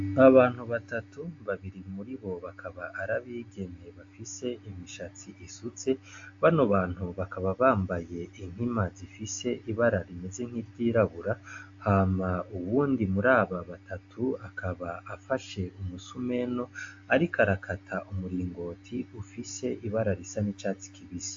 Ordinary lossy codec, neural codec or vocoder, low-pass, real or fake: AAC, 48 kbps; none; 7.2 kHz; real